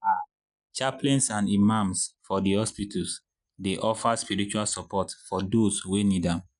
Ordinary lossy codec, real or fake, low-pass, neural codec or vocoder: none; real; 10.8 kHz; none